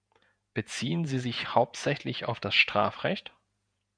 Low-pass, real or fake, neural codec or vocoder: 9.9 kHz; fake; vocoder, 48 kHz, 128 mel bands, Vocos